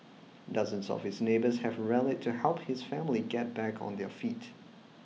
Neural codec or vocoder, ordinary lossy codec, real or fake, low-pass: none; none; real; none